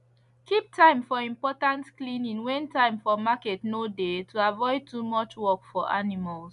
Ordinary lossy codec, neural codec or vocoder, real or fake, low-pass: none; none; real; 10.8 kHz